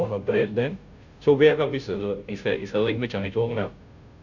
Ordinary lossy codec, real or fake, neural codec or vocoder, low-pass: none; fake; codec, 16 kHz, 0.5 kbps, FunCodec, trained on Chinese and English, 25 frames a second; 7.2 kHz